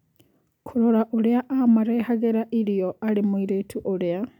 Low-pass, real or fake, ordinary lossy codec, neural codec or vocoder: 19.8 kHz; real; none; none